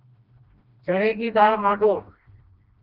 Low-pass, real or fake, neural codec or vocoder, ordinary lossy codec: 5.4 kHz; fake; codec, 16 kHz, 1 kbps, FreqCodec, smaller model; Opus, 32 kbps